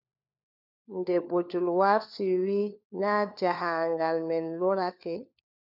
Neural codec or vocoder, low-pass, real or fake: codec, 16 kHz, 4 kbps, FunCodec, trained on LibriTTS, 50 frames a second; 5.4 kHz; fake